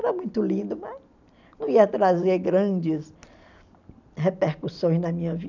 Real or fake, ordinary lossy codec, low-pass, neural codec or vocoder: real; none; 7.2 kHz; none